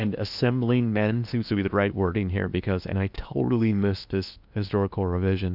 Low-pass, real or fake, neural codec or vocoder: 5.4 kHz; fake; codec, 16 kHz in and 24 kHz out, 0.8 kbps, FocalCodec, streaming, 65536 codes